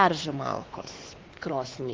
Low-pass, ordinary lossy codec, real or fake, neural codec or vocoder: 7.2 kHz; Opus, 16 kbps; fake; codec, 16 kHz, 2 kbps, X-Codec, WavLM features, trained on Multilingual LibriSpeech